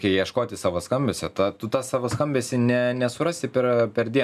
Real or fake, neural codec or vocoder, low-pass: real; none; 14.4 kHz